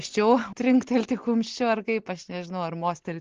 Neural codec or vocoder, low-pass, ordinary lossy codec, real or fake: none; 7.2 kHz; Opus, 16 kbps; real